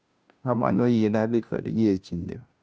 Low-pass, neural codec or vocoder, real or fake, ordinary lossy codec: none; codec, 16 kHz, 0.5 kbps, FunCodec, trained on Chinese and English, 25 frames a second; fake; none